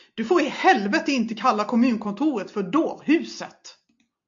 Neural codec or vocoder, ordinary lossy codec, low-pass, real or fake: none; MP3, 48 kbps; 7.2 kHz; real